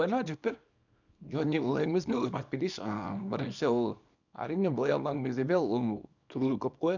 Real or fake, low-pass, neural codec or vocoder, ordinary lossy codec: fake; 7.2 kHz; codec, 24 kHz, 0.9 kbps, WavTokenizer, small release; none